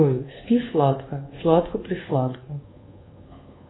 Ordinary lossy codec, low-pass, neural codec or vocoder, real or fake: AAC, 16 kbps; 7.2 kHz; codec, 24 kHz, 1.2 kbps, DualCodec; fake